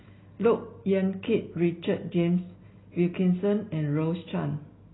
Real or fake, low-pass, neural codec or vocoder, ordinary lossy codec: real; 7.2 kHz; none; AAC, 16 kbps